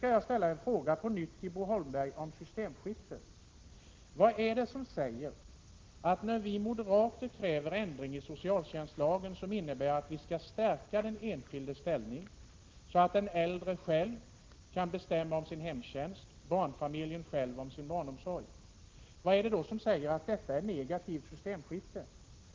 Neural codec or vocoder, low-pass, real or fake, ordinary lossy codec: none; 7.2 kHz; real; Opus, 16 kbps